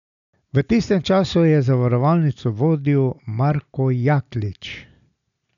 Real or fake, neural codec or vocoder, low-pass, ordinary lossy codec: real; none; 7.2 kHz; none